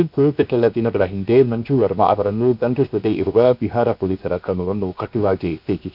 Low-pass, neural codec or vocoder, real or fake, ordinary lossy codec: 5.4 kHz; codec, 16 kHz, 0.7 kbps, FocalCodec; fake; none